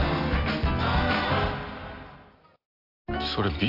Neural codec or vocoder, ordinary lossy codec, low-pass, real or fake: none; AAC, 32 kbps; 5.4 kHz; real